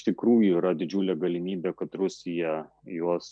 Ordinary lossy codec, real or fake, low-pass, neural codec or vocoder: MP3, 96 kbps; real; 9.9 kHz; none